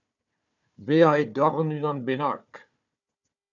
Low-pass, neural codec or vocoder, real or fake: 7.2 kHz; codec, 16 kHz, 4 kbps, FunCodec, trained on Chinese and English, 50 frames a second; fake